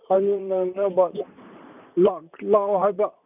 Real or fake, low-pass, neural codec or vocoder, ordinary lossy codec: fake; 3.6 kHz; vocoder, 44.1 kHz, 128 mel bands, Pupu-Vocoder; none